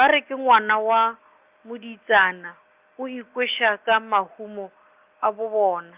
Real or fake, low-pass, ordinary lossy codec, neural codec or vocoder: real; 3.6 kHz; Opus, 24 kbps; none